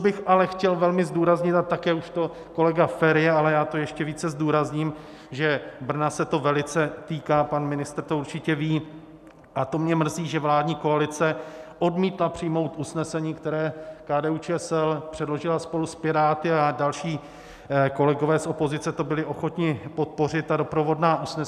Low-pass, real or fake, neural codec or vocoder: 14.4 kHz; real; none